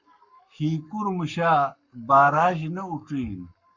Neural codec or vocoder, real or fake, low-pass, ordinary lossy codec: codec, 44.1 kHz, 7.8 kbps, Pupu-Codec; fake; 7.2 kHz; Opus, 64 kbps